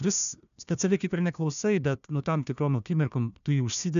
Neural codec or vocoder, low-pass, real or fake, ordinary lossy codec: codec, 16 kHz, 1 kbps, FunCodec, trained on Chinese and English, 50 frames a second; 7.2 kHz; fake; MP3, 96 kbps